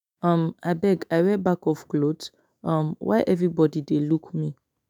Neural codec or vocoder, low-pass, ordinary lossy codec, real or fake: autoencoder, 48 kHz, 128 numbers a frame, DAC-VAE, trained on Japanese speech; none; none; fake